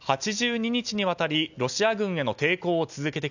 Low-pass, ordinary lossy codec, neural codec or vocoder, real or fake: 7.2 kHz; none; none; real